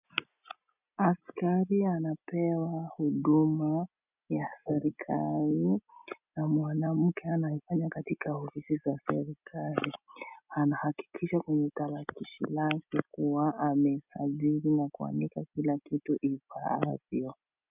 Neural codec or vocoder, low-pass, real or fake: none; 3.6 kHz; real